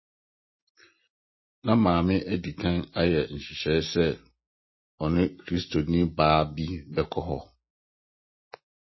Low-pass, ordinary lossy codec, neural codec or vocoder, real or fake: 7.2 kHz; MP3, 24 kbps; none; real